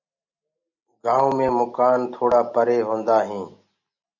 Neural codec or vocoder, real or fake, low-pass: none; real; 7.2 kHz